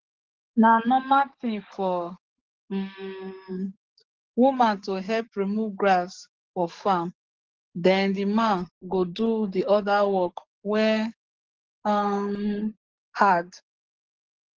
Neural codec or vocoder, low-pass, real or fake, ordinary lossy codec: codec, 44.1 kHz, 7.8 kbps, DAC; 7.2 kHz; fake; Opus, 16 kbps